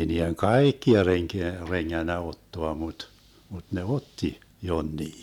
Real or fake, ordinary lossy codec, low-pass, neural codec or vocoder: real; none; 19.8 kHz; none